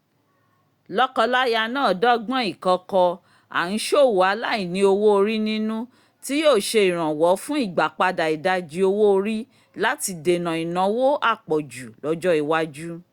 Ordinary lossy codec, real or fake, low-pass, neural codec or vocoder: Opus, 64 kbps; real; 19.8 kHz; none